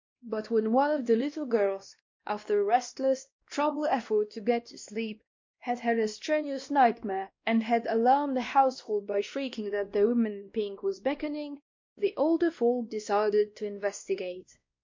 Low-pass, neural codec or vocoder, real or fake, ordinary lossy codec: 7.2 kHz; codec, 16 kHz, 1 kbps, X-Codec, WavLM features, trained on Multilingual LibriSpeech; fake; MP3, 48 kbps